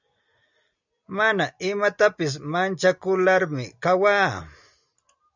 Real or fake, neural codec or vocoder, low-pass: real; none; 7.2 kHz